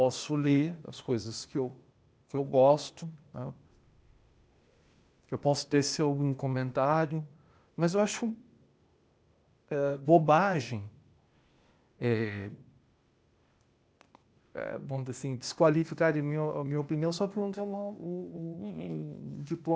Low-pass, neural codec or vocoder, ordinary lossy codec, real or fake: none; codec, 16 kHz, 0.8 kbps, ZipCodec; none; fake